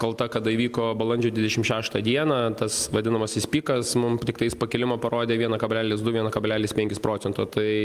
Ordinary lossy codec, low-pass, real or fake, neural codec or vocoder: Opus, 32 kbps; 14.4 kHz; real; none